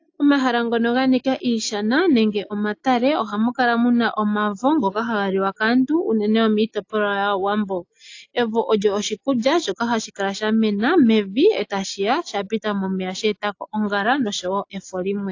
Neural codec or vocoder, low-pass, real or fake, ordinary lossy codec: none; 7.2 kHz; real; AAC, 48 kbps